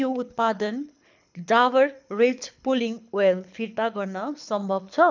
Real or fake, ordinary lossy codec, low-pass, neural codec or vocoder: fake; none; 7.2 kHz; codec, 24 kHz, 6 kbps, HILCodec